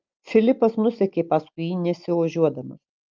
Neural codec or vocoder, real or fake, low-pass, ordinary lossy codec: none; real; 7.2 kHz; Opus, 24 kbps